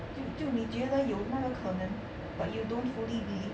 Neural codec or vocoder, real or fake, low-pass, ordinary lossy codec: none; real; none; none